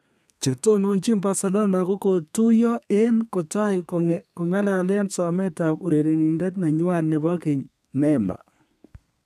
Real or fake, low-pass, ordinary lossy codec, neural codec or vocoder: fake; 14.4 kHz; none; codec, 32 kHz, 1.9 kbps, SNAC